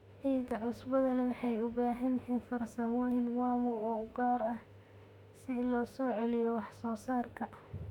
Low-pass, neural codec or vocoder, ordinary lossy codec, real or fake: 19.8 kHz; autoencoder, 48 kHz, 32 numbers a frame, DAC-VAE, trained on Japanese speech; MP3, 96 kbps; fake